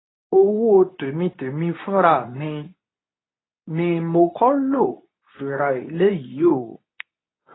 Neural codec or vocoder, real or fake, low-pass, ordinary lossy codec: codec, 24 kHz, 0.9 kbps, WavTokenizer, medium speech release version 2; fake; 7.2 kHz; AAC, 16 kbps